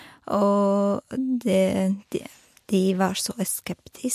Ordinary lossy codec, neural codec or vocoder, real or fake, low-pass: MP3, 64 kbps; none; real; 14.4 kHz